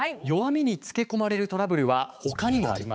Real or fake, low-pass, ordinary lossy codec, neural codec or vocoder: fake; none; none; codec, 16 kHz, 4 kbps, X-Codec, HuBERT features, trained on balanced general audio